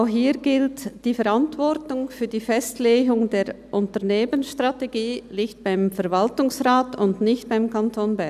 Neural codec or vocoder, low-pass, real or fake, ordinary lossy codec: none; 14.4 kHz; real; none